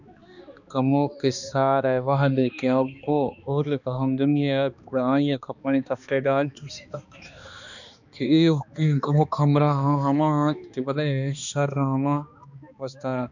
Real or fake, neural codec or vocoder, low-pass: fake; codec, 16 kHz, 2 kbps, X-Codec, HuBERT features, trained on balanced general audio; 7.2 kHz